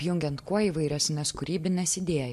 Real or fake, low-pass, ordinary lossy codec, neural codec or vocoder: real; 14.4 kHz; MP3, 64 kbps; none